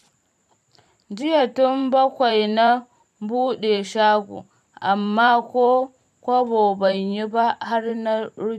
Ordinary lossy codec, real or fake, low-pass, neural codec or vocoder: none; fake; 14.4 kHz; vocoder, 44.1 kHz, 128 mel bands every 512 samples, BigVGAN v2